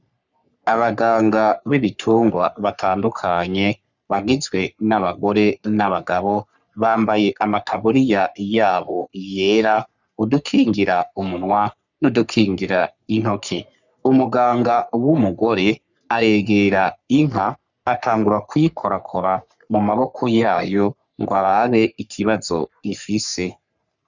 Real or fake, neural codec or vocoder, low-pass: fake; codec, 44.1 kHz, 3.4 kbps, Pupu-Codec; 7.2 kHz